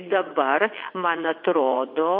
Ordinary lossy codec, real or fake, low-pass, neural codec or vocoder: MP3, 32 kbps; fake; 5.4 kHz; vocoder, 22.05 kHz, 80 mel bands, WaveNeXt